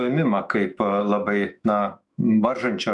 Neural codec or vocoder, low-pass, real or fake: autoencoder, 48 kHz, 128 numbers a frame, DAC-VAE, trained on Japanese speech; 10.8 kHz; fake